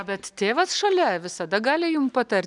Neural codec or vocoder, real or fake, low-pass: none; real; 10.8 kHz